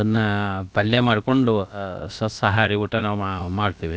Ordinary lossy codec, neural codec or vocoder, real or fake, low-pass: none; codec, 16 kHz, about 1 kbps, DyCAST, with the encoder's durations; fake; none